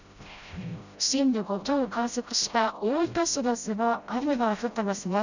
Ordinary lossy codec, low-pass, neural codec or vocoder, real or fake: none; 7.2 kHz; codec, 16 kHz, 0.5 kbps, FreqCodec, smaller model; fake